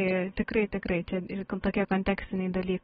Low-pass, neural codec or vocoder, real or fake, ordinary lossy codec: 19.8 kHz; none; real; AAC, 16 kbps